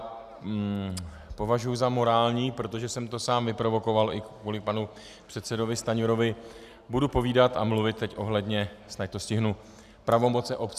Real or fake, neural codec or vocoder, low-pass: real; none; 14.4 kHz